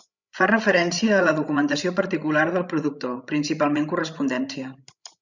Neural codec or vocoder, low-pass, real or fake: codec, 16 kHz, 16 kbps, FreqCodec, larger model; 7.2 kHz; fake